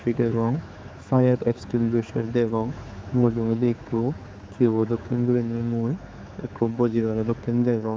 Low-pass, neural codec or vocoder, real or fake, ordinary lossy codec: none; codec, 16 kHz, 4 kbps, X-Codec, HuBERT features, trained on general audio; fake; none